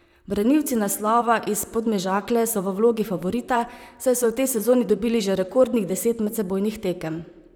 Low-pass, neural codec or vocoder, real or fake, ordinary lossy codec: none; vocoder, 44.1 kHz, 128 mel bands, Pupu-Vocoder; fake; none